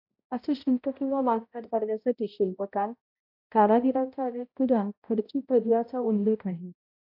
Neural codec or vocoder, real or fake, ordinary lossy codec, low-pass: codec, 16 kHz, 0.5 kbps, X-Codec, HuBERT features, trained on balanced general audio; fake; AAC, 48 kbps; 5.4 kHz